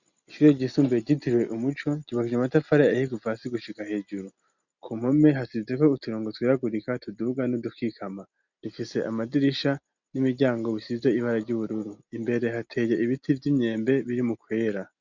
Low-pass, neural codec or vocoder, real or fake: 7.2 kHz; none; real